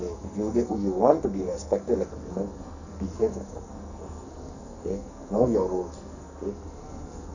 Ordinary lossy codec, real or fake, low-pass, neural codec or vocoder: none; fake; 7.2 kHz; codec, 44.1 kHz, 2.6 kbps, SNAC